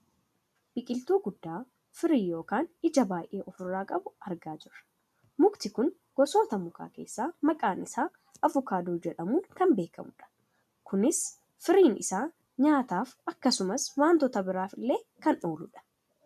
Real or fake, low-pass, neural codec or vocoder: real; 14.4 kHz; none